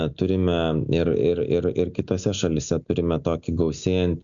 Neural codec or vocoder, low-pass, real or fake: none; 7.2 kHz; real